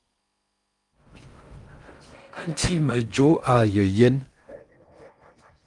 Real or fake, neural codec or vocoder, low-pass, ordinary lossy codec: fake; codec, 16 kHz in and 24 kHz out, 0.6 kbps, FocalCodec, streaming, 4096 codes; 10.8 kHz; Opus, 32 kbps